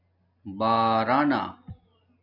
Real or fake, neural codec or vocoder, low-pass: real; none; 5.4 kHz